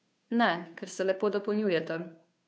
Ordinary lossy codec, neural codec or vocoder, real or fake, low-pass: none; codec, 16 kHz, 2 kbps, FunCodec, trained on Chinese and English, 25 frames a second; fake; none